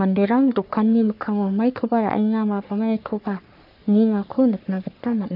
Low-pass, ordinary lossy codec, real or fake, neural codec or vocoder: 5.4 kHz; none; fake; codec, 44.1 kHz, 3.4 kbps, Pupu-Codec